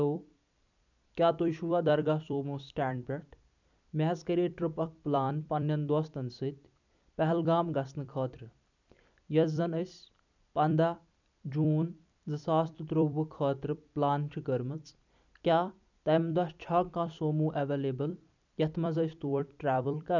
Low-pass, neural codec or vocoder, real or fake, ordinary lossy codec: 7.2 kHz; vocoder, 44.1 kHz, 128 mel bands every 256 samples, BigVGAN v2; fake; none